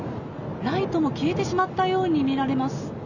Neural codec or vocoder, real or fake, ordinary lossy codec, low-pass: none; real; none; 7.2 kHz